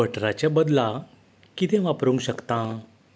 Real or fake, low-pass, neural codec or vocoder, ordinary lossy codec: real; none; none; none